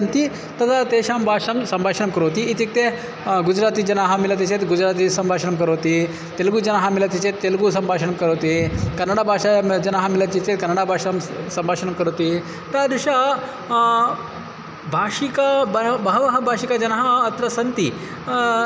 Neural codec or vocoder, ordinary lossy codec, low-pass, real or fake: none; none; none; real